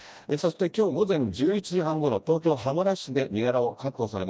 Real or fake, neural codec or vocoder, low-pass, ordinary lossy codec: fake; codec, 16 kHz, 1 kbps, FreqCodec, smaller model; none; none